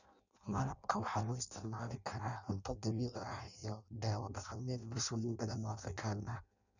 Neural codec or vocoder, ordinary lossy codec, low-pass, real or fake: codec, 16 kHz in and 24 kHz out, 0.6 kbps, FireRedTTS-2 codec; none; 7.2 kHz; fake